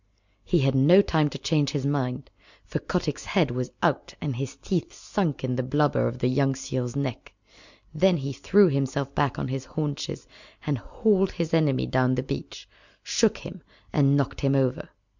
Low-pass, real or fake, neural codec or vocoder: 7.2 kHz; real; none